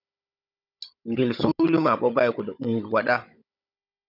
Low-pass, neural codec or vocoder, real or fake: 5.4 kHz; codec, 16 kHz, 16 kbps, FunCodec, trained on Chinese and English, 50 frames a second; fake